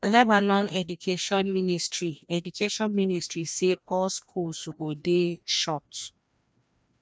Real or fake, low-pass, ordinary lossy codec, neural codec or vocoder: fake; none; none; codec, 16 kHz, 1 kbps, FreqCodec, larger model